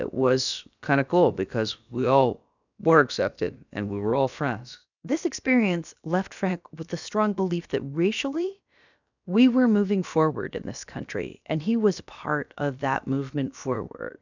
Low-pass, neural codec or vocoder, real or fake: 7.2 kHz; codec, 16 kHz, about 1 kbps, DyCAST, with the encoder's durations; fake